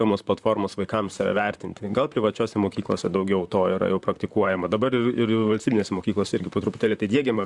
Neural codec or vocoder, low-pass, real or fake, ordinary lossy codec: vocoder, 44.1 kHz, 128 mel bands, Pupu-Vocoder; 10.8 kHz; fake; Opus, 64 kbps